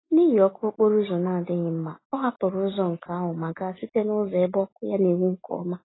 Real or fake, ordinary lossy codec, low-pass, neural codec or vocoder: real; AAC, 16 kbps; 7.2 kHz; none